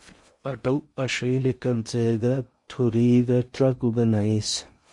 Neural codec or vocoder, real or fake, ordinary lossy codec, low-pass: codec, 16 kHz in and 24 kHz out, 0.6 kbps, FocalCodec, streaming, 2048 codes; fake; MP3, 48 kbps; 10.8 kHz